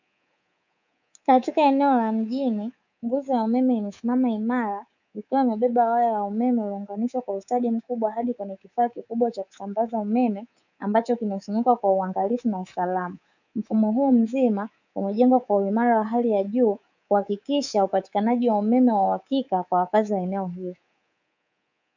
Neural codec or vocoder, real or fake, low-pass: codec, 24 kHz, 3.1 kbps, DualCodec; fake; 7.2 kHz